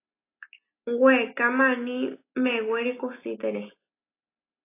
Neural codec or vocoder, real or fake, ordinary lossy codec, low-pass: none; real; AAC, 24 kbps; 3.6 kHz